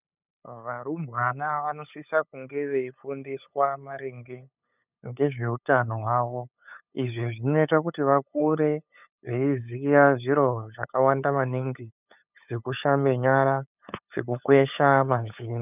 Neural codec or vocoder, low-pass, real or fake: codec, 16 kHz, 8 kbps, FunCodec, trained on LibriTTS, 25 frames a second; 3.6 kHz; fake